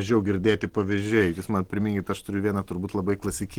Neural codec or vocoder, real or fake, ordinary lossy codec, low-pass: none; real; Opus, 16 kbps; 19.8 kHz